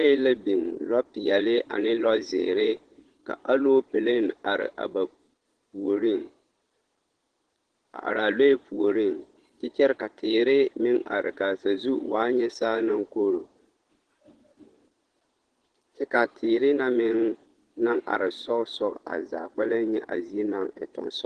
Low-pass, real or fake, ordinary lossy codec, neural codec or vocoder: 9.9 kHz; fake; Opus, 16 kbps; vocoder, 22.05 kHz, 80 mel bands, Vocos